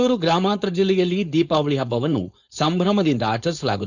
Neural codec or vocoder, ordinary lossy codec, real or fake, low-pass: codec, 16 kHz, 4.8 kbps, FACodec; none; fake; 7.2 kHz